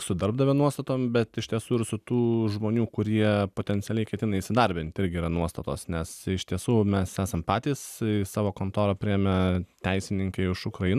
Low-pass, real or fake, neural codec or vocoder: 14.4 kHz; real; none